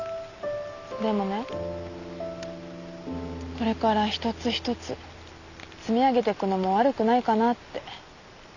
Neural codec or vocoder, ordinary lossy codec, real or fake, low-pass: none; none; real; 7.2 kHz